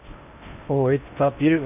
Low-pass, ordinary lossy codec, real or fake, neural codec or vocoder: 3.6 kHz; none; fake; codec, 16 kHz in and 24 kHz out, 0.6 kbps, FocalCodec, streaming, 4096 codes